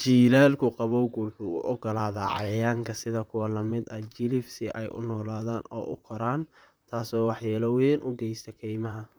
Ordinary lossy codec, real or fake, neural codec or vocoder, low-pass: none; fake; vocoder, 44.1 kHz, 128 mel bands, Pupu-Vocoder; none